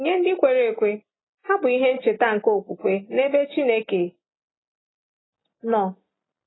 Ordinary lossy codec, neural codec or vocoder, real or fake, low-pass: AAC, 16 kbps; none; real; 7.2 kHz